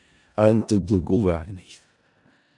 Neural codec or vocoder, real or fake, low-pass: codec, 16 kHz in and 24 kHz out, 0.4 kbps, LongCat-Audio-Codec, four codebook decoder; fake; 10.8 kHz